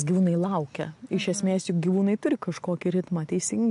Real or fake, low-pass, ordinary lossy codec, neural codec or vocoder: real; 10.8 kHz; MP3, 64 kbps; none